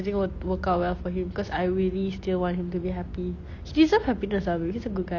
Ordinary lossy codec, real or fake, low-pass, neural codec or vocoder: Opus, 64 kbps; real; 7.2 kHz; none